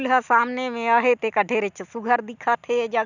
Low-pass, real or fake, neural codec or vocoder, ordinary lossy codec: 7.2 kHz; real; none; none